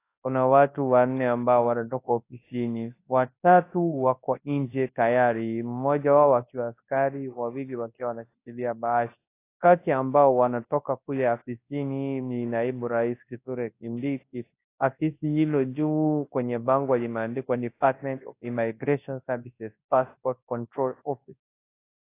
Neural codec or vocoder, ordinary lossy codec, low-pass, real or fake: codec, 24 kHz, 0.9 kbps, WavTokenizer, large speech release; AAC, 24 kbps; 3.6 kHz; fake